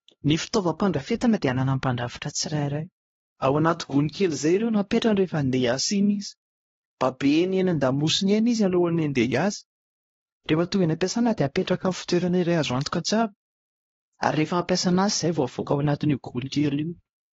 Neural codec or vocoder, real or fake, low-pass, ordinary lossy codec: codec, 16 kHz, 1 kbps, X-Codec, HuBERT features, trained on LibriSpeech; fake; 7.2 kHz; AAC, 24 kbps